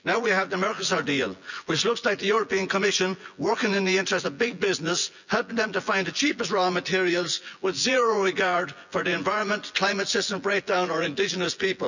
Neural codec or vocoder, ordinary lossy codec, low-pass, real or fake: vocoder, 24 kHz, 100 mel bands, Vocos; none; 7.2 kHz; fake